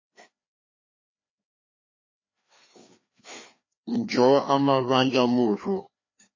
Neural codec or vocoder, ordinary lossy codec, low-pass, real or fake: codec, 16 kHz, 2 kbps, FreqCodec, larger model; MP3, 32 kbps; 7.2 kHz; fake